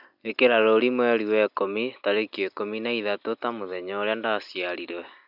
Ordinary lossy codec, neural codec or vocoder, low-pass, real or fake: none; none; 5.4 kHz; real